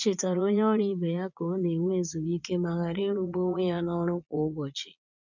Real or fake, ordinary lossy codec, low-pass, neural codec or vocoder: fake; none; 7.2 kHz; vocoder, 22.05 kHz, 80 mel bands, Vocos